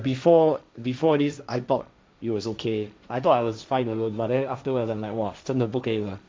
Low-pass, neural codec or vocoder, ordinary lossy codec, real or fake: none; codec, 16 kHz, 1.1 kbps, Voila-Tokenizer; none; fake